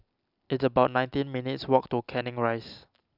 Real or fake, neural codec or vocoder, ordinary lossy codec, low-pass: real; none; none; 5.4 kHz